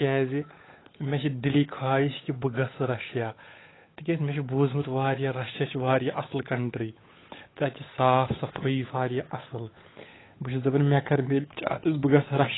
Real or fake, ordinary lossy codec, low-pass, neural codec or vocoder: fake; AAC, 16 kbps; 7.2 kHz; codec, 16 kHz, 8 kbps, FunCodec, trained on Chinese and English, 25 frames a second